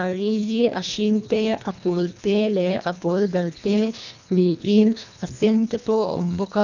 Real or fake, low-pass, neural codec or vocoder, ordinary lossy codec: fake; 7.2 kHz; codec, 24 kHz, 1.5 kbps, HILCodec; none